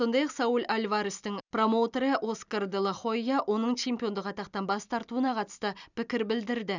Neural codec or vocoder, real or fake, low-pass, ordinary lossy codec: none; real; 7.2 kHz; none